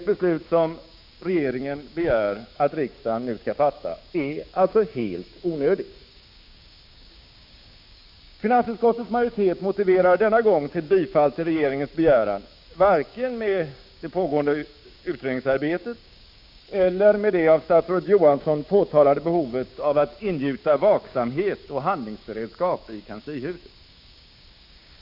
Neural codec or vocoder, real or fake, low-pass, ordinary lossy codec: codec, 16 kHz, 6 kbps, DAC; fake; 5.4 kHz; none